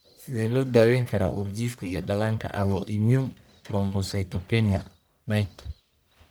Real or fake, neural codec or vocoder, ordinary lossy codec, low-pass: fake; codec, 44.1 kHz, 1.7 kbps, Pupu-Codec; none; none